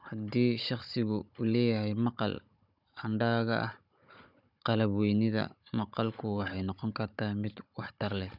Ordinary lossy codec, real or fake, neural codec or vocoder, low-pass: none; fake; codec, 16 kHz, 16 kbps, FunCodec, trained on Chinese and English, 50 frames a second; 5.4 kHz